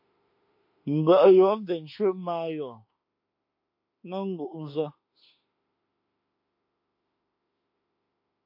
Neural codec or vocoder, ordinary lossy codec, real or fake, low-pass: autoencoder, 48 kHz, 32 numbers a frame, DAC-VAE, trained on Japanese speech; MP3, 32 kbps; fake; 5.4 kHz